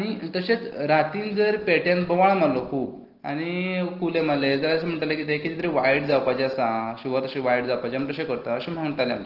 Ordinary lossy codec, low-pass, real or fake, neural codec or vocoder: Opus, 16 kbps; 5.4 kHz; real; none